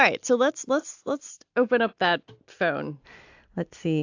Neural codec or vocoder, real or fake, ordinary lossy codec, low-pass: none; real; AAC, 48 kbps; 7.2 kHz